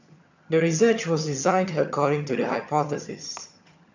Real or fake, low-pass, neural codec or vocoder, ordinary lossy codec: fake; 7.2 kHz; vocoder, 22.05 kHz, 80 mel bands, HiFi-GAN; none